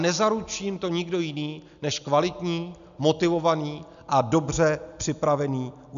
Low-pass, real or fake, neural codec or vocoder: 7.2 kHz; real; none